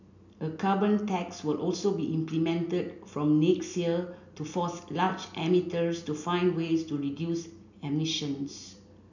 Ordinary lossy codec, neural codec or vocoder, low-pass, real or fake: none; none; 7.2 kHz; real